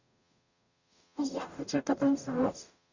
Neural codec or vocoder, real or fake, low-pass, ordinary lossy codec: codec, 44.1 kHz, 0.9 kbps, DAC; fake; 7.2 kHz; none